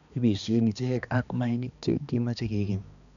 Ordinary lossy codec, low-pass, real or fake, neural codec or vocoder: none; 7.2 kHz; fake; codec, 16 kHz, 2 kbps, X-Codec, HuBERT features, trained on balanced general audio